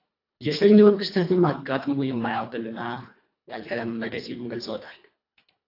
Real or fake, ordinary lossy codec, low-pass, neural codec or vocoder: fake; AAC, 32 kbps; 5.4 kHz; codec, 24 kHz, 1.5 kbps, HILCodec